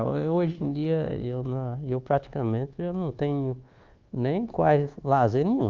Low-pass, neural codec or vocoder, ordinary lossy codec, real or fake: 7.2 kHz; codec, 24 kHz, 1.2 kbps, DualCodec; Opus, 32 kbps; fake